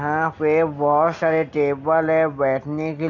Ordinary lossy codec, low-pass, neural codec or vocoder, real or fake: none; 7.2 kHz; none; real